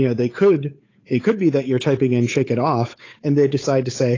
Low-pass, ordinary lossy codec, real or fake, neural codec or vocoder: 7.2 kHz; AAC, 32 kbps; fake; codec, 16 kHz, 8 kbps, FunCodec, trained on Chinese and English, 25 frames a second